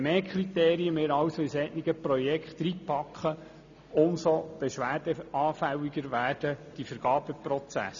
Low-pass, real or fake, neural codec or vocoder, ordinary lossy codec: 7.2 kHz; real; none; none